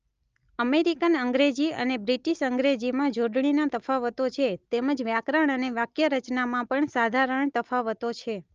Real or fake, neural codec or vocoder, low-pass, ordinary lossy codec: real; none; 7.2 kHz; Opus, 24 kbps